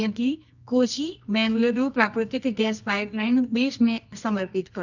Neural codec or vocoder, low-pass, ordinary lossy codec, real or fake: codec, 24 kHz, 0.9 kbps, WavTokenizer, medium music audio release; 7.2 kHz; none; fake